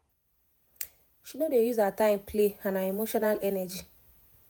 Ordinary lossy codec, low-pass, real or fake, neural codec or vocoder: none; none; fake; vocoder, 48 kHz, 128 mel bands, Vocos